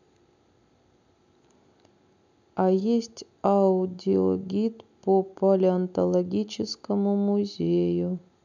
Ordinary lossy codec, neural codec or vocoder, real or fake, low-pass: none; none; real; 7.2 kHz